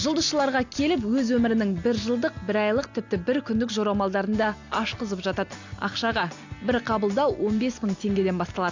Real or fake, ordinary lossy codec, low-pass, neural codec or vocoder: real; AAC, 48 kbps; 7.2 kHz; none